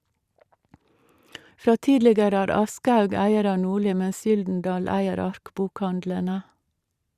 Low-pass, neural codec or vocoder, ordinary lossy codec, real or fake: 14.4 kHz; vocoder, 44.1 kHz, 128 mel bands every 512 samples, BigVGAN v2; Opus, 64 kbps; fake